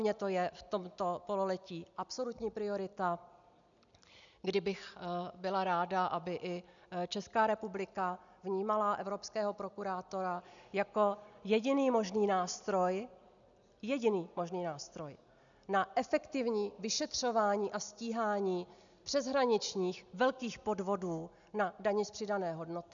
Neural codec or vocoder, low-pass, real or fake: none; 7.2 kHz; real